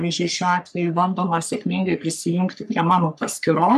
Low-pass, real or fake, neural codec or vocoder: 14.4 kHz; fake; codec, 44.1 kHz, 3.4 kbps, Pupu-Codec